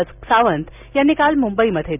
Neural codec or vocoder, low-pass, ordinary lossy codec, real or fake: none; 3.6 kHz; none; real